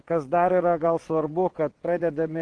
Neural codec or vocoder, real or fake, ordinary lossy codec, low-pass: vocoder, 22.05 kHz, 80 mel bands, WaveNeXt; fake; Opus, 16 kbps; 9.9 kHz